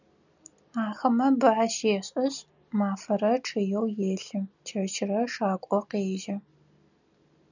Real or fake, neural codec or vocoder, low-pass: real; none; 7.2 kHz